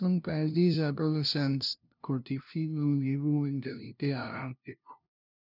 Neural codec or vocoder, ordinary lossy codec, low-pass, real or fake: codec, 16 kHz, 0.5 kbps, FunCodec, trained on LibriTTS, 25 frames a second; none; 5.4 kHz; fake